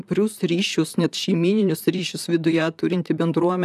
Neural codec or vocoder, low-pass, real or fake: vocoder, 44.1 kHz, 128 mel bands, Pupu-Vocoder; 14.4 kHz; fake